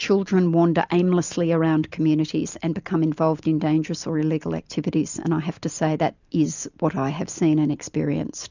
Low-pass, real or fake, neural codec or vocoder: 7.2 kHz; real; none